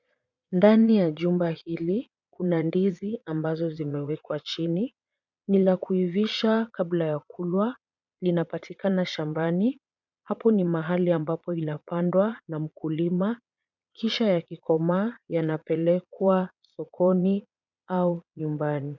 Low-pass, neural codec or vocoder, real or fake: 7.2 kHz; vocoder, 22.05 kHz, 80 mel bands, Vocos; fake